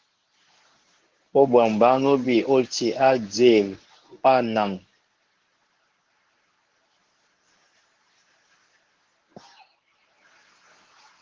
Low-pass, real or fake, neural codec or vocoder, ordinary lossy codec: 7.2 kHz; fake; codec, 24 kHz, 0.9 kbps, WavTokenizer, medium speech release version 1; Opus, 16 kbps